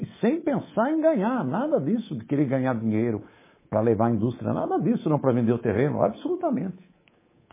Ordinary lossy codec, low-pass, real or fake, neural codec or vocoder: MP3, 16 kbps; 3.6 kHz; fake; codec, 24 kHz, 3.1 kbps, DualCodec